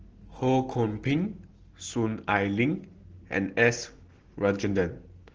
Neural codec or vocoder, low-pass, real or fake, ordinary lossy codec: none; 7.2 kHz; real; Opus, 16 kbps